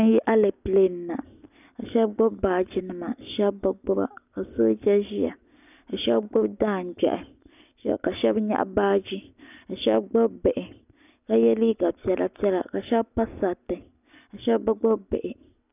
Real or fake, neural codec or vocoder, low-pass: real; none; 3.6 kHz